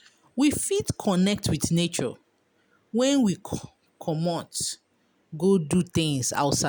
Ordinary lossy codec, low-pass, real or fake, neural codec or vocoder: none; none; real; none